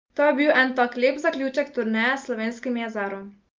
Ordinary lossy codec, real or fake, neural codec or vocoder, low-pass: Opus, 32 kbps; real; none; 7.2 kHz